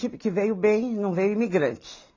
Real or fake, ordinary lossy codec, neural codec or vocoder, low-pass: real; AAC, 32 kbps; none; 7.2 kHz